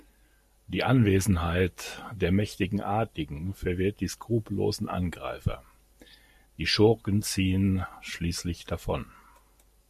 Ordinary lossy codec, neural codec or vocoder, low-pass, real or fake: MP3, 64 kbps; none; 14.4 kHz; real